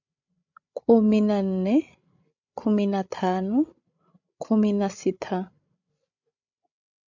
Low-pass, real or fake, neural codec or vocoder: 7.2 kHz; fake; codec, 16 kHz, 16 kbps, FreqCodec, larger model